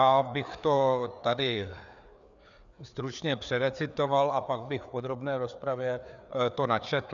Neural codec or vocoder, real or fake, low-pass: codec, 16 kHz, 4 kbps, FreqCodec, larger model; fake; 7.2 kHz